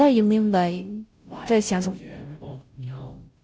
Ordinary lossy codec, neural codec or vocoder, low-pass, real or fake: none; codec, 16 kHz, 0.5 kbps, FunCodec, trained on Chinese and English, 25 frames a second; none; fake